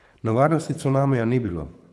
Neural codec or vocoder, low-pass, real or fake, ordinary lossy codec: codec, 24 kHz, 6 kbps, HILCodec; none; fake; none